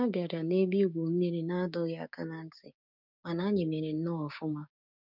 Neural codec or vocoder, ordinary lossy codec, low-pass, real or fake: codec, 16 kHz, 6 kbps, DAC; none; 5.4 kHz; fake